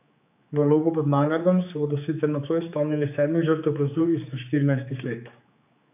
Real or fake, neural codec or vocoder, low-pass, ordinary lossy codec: fake; codec, 16 kHz, 4 kbps, X-Codec, HuBERT features, trained on general audio; 3.6 kHz; AAC, 32 kbps